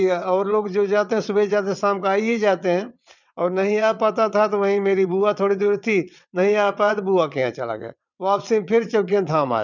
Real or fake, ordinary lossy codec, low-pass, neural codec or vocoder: fake; none; 7.2 kHz; vocoder, 22.05 kHz, 80 mel bands, WaveNeXt